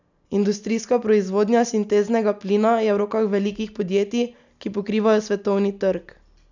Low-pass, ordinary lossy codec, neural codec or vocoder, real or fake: 7.2 kHz; none; none; real